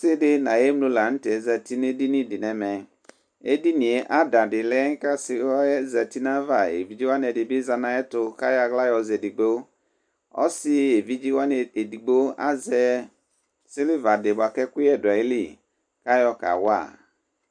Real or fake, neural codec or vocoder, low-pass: real; none; 9.9 kHz